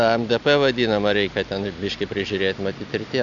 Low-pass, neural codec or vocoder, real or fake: 7.2 kHz; none; real